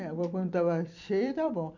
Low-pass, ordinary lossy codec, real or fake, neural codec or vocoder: 7.2 kHz; none; fake; vocoder, 44.1 kHz, 128 mel bands every 256 samples, BigVGAN v2